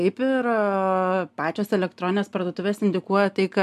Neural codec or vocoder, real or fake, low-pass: none; real; 14.4 kHz